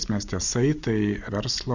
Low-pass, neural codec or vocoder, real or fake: 7.2 kHz; none; real